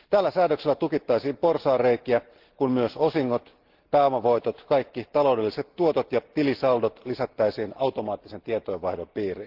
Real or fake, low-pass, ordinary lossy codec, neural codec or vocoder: real; 5.4 kHz; Opus, 16 kbps; none